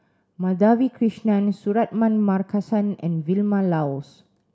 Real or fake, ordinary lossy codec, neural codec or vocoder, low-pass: real; none; none; none